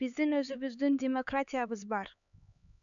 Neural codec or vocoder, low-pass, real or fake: codec, 16 kHz, 4 kbps, X-Codec, HuBERT features, trained on LibriSpeech; 7.2 kHz; fake